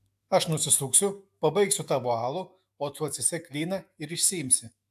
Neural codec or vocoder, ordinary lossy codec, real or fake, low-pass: autoencoder, 48 kHz, 128 numbers a frame, DAC-VAE, trained on Japanese speech; AAC, 96 kbps; fake; 14.4 kHz